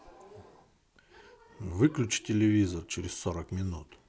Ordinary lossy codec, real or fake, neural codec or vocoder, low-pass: none; real; none; none